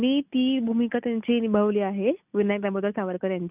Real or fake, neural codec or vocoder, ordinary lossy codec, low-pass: real; none; none; 3.6 kHz